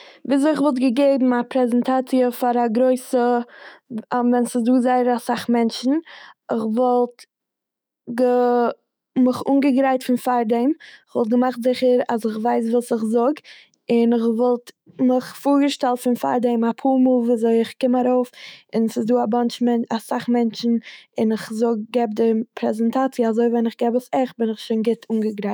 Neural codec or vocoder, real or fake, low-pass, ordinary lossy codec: autoencoder, 48 kHz, 128 numbers a frame, DAC-VAE, trained on Japanese speech; fake; none; none